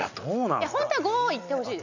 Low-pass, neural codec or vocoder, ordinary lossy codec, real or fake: 7.2 kHz; none; none; real